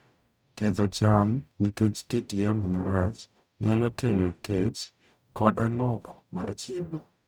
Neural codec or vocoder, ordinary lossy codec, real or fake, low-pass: codec, 44.1 kHz, 0.9 kbps, DAC; none; fake; none